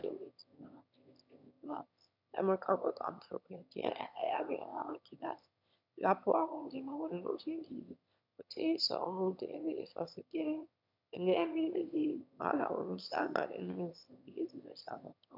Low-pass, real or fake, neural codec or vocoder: 5.4 kHz; fake; autoencoder, 22.05 kHz, a latent of 192 numbers a frame, VITS, trained on one speaker